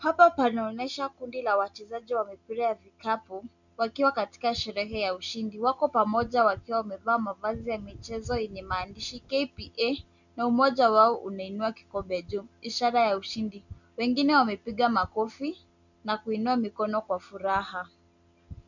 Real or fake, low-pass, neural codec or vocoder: real; 7.2 kHz; none